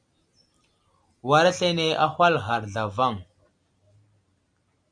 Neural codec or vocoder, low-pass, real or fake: none; 9.9 kHz; real